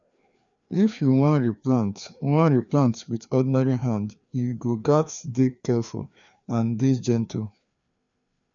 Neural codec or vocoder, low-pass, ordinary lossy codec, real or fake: codec, 16 kHz, 2 kbps, FreqCodec, larger model; 7.2 kHz; none; fake